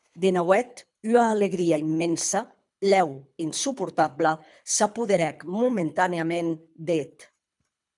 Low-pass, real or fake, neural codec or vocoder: 10.8 kHz; fake; codec, 24 kHz, 3 kbps, HILCodec